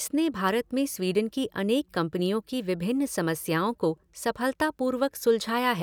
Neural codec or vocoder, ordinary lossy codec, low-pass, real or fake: none; none; none; real